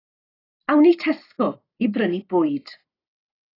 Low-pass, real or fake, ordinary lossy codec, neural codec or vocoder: 5.4 kHz; real; AAC, 24 kbps; none